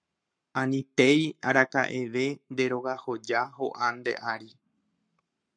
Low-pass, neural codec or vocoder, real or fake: 9.9 kHz; codec, 44.1 kHz, 7.8 kbps, Pupu-Codec; fake